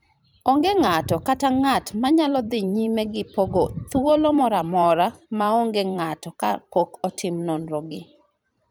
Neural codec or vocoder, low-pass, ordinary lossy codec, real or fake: vocoder, 44.1 kHz, 128 mel bands every 512 samples, BigVGAN v2; none; none; fake